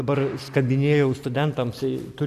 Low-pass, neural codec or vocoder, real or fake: 14.4 kHz; none; real